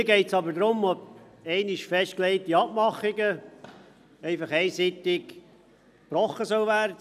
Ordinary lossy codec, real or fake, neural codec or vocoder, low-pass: none; real; none; 14.4 kHz